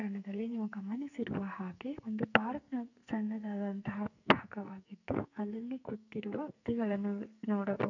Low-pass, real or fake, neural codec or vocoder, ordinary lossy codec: 7.2 kHz; fake; codec, 44.1 kHz, 2.6 kbps, SNAC; none